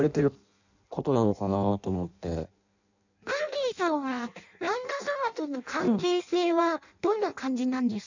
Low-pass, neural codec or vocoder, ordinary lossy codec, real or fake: 7.2 kHz; codec, 16 kHz in and 24 kHz out, 0.6 kbps, FireRedTTS-2 codec; none; fake